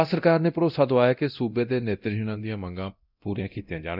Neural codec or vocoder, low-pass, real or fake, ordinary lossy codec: codec, 24 kHz, 0.9 kbps, DualCodec; 5.4 kHz; fake; none